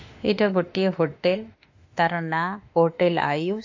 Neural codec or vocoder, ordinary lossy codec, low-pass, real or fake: autoencoder, 48 kHz, 32 numbers a frame, DAC-VAE, trained on Japanese speech; AAC, 48 kbps; 7.2 kHz; fake